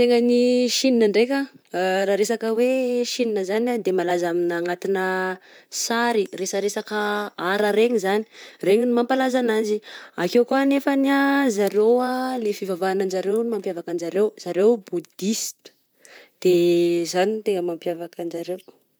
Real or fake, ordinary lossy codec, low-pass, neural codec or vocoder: fake; none; none; vocoder, 44.1 kHz, 128 mel bands, Pupu-Vocoder